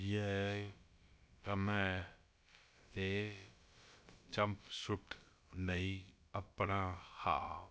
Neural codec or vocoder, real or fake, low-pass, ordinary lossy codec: codec, 16 kHz, about 1 kbps, DyCAST, with the encoder's durations; fake; none; none